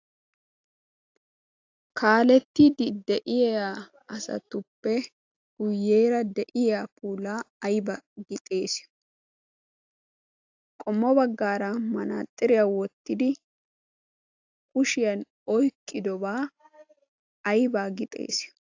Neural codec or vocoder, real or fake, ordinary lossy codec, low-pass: none; real; AAC, 48 kbps; 7.2 kHz